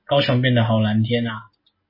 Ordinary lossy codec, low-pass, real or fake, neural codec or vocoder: MP3, 24 kbps; 5.4 kHz; real; none